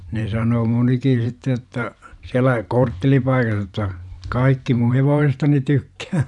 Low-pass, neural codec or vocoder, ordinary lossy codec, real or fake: 10.8 kHz; vocoder, 44.1 kHz, 128 mel bands every 512 samples, BigVGAN v2; none; fake